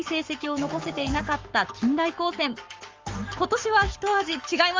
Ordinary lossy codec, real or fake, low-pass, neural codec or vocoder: Opus, 32 kbps; fake; 7.2 kHz; codec, 44.1 kHz, 7.8 kbps, Pupu-Codec